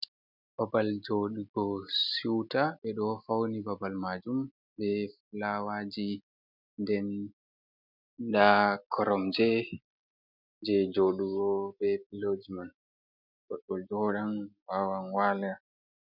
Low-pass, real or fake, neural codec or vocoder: 5.4 kHz; real; none